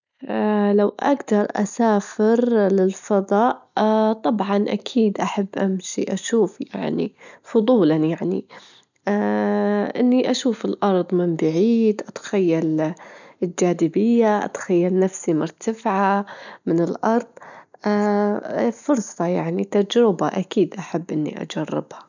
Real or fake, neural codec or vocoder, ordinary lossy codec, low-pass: real; none; none; 7.2 kHz